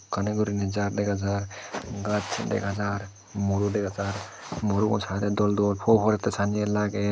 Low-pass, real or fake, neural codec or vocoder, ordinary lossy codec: none; real; none; none